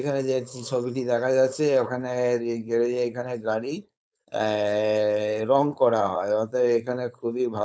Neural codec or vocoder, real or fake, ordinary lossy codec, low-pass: codec, 16 kHz, 4.8 kbps, FACodec; fake; none; none